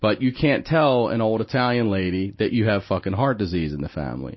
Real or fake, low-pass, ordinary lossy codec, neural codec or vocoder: real; 7.2 kHz; MP3, 24 kbps; none